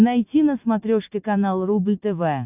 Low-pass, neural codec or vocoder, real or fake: 3.6 kHz; none; real